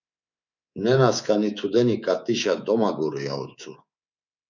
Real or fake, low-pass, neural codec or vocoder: fake; 7.2 kHz; codec, 24 kHz, 3.1 kbps, DualCodec